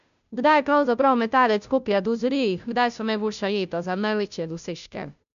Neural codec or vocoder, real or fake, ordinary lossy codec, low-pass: codec, 16 kHz, 0.5 kbps, FunCodec, trained on Chinese and English, 25 frames a second; fake; none; 7.2 kHz